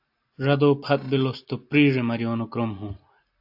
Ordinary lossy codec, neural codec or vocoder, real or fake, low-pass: AAC, 48 kbps; none; real; 5.4 kHz